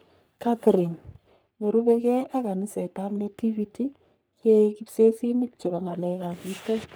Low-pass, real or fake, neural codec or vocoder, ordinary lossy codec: none; fake; codec, 44.1 kHz, 3.4 kbps, Pupu-Codec; none